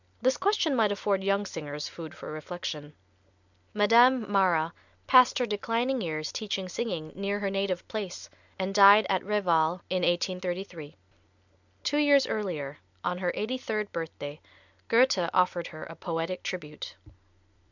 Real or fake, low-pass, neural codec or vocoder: real; 7.2 kHz; none